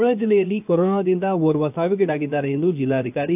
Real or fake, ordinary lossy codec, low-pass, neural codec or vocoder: fake; AAC, 32 kbps; 3.6 kHz; codec, 16 kHz, 0.7 kbps, FocalCodec